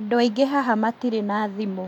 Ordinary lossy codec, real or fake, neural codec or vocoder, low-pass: none; fake; vocoder, 44.1 kHz, 128 mel bands every 512 samples, BigVGAN v2; 19.8 kHz